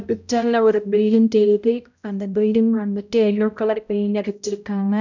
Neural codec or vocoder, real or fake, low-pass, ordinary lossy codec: codec, 16 kHz, 0.5 kbps, X-Codec, HuBERT features, trained on balanced general audio; fake; 7.2 kHz; none